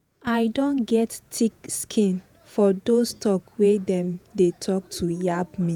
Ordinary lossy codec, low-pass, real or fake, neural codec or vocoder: none; none; fake; vocoder, 48 kHz, 128 mel bands, Vocos